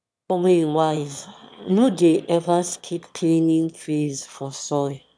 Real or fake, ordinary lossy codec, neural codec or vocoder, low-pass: fake; none; autoencoder, 22.05 kHz, a latent of 192 numbers a frame, VITS, trained on one speaker; none